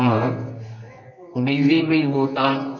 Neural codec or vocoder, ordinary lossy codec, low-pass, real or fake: codec, 24 kHz, 0.9 kbps, WavTokenizer, medium music audio release; none; 7.2 kHz; fake